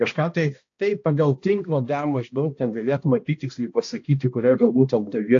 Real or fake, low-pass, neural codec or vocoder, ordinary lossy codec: fake; 7.2 kHz; codec, 16 kHz, 1 kbps, X-Codec, HuBERT features, trained on balanced general audio; AAC, 48 kbps